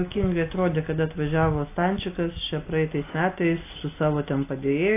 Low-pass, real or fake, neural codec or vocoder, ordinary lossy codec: 3.6 kHz; real; none; AAC, 24 kbps